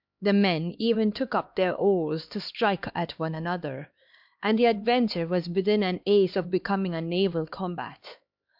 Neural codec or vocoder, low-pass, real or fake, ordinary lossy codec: codec, 16 kHz, 2 kbps, X-Codec, HuBERT features, trained on LibriSpeech; 5.4 kHz; fake; MP3, 48 kbps